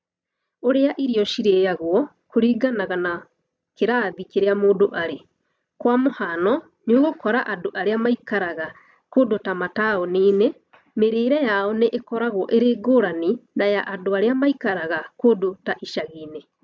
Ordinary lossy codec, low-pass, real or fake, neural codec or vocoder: none; none; real; none